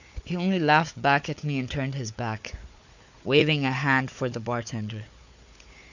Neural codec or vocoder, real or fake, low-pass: codec, 16 kHz, 4 kbps, FunCodec, trained on Chinese and English, 50 frames a second; fake; 7.2 kHz